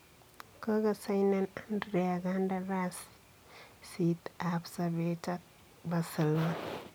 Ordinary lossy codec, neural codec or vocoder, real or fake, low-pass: none; none; real; none